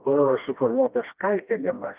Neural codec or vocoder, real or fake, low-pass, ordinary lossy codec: codec, 16 kHz, 1 kbps, FreqCodec, smaller model; fake; 3.6 kHz; Opus, 32 kbps